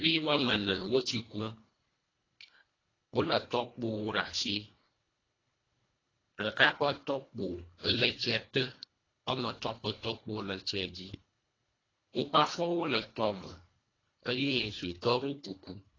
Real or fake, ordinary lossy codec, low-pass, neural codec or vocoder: fake; AAC, 32 kbps; 7.2 kHz; codec, 24 kHz, 1.5 kbps, HILCodec